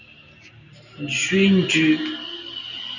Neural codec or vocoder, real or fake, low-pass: none; real; 7.2 kHz